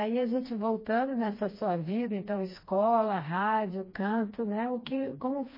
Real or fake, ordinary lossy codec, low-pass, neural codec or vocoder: fake; MP3, 24 kbps; 5.4 kHz; codec, 16 kHz, 2 kbps, FreqCodec, smaller model